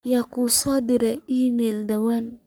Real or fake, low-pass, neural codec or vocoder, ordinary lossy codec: fake; none; codec, 44.1 kHz, 3.4 kbps, Pupu-Codec; none